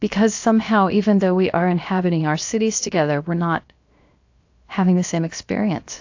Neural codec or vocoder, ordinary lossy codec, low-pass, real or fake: codec, 16 kHz, 0.7 kbps, FocalCodec; AAC, 48 kbps; 7.2 kHz; fake